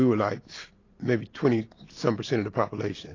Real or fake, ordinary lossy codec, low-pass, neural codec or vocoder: real; AAC, 32 kbps; 7.2 kHz; none